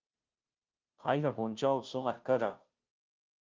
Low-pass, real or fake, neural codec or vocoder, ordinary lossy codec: 7.2 kHz; fake; codec, 16 kHz, 0.5 kbps, FunCodec, trained on Chinese and English, 25 frames a second; Opus, 32 kbps